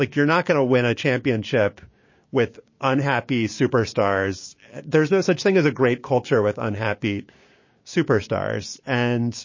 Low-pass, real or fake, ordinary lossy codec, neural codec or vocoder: 7.2 kHz; fake; MP3, 32 kbps; codec, 16 kHz, 6 kbps, DAC